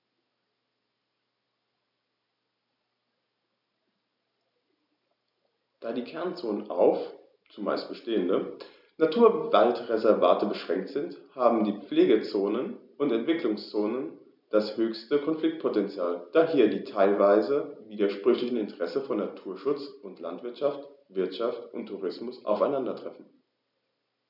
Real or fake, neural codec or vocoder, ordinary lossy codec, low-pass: real; none; none; 5.4 kHz